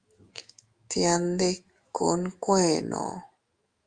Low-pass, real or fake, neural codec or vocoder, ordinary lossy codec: 9.9 kHz; fake; autoencoder, 48 kHz, 128 numbers a frame, DAC-VAE, trained on Japanese speech; Opus, 32 kbps